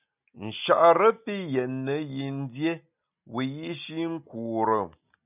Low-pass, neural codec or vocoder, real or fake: 3.6 kHz; none; real